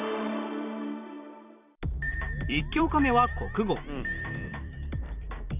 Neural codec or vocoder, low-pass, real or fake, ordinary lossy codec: vocoder, 44.1 kHz, 128 mel bands every 256 samples, BigVGAN v2; 3.6 kHz; fake; none